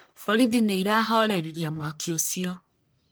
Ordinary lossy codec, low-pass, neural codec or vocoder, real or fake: none; none; codec, 44.1 kHz, 1.7 kbps, Pupu-Codec; fake